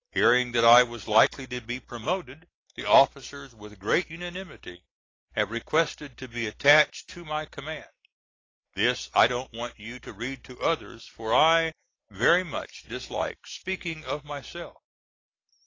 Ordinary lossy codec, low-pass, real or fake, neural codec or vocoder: AAC, 32 kbps; 7.2 kHz; real; none